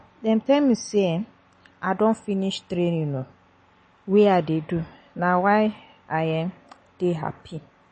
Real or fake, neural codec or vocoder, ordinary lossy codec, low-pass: real; none; MP3, 32 kbps; 10.8 kHz